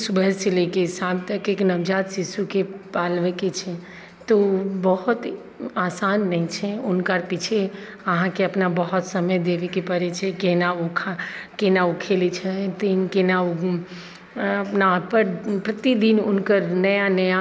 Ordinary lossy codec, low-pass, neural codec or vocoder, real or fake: none; none; none; real